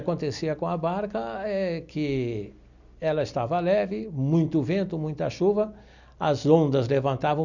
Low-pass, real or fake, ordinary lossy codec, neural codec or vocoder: 7.2 kHz; real; none; none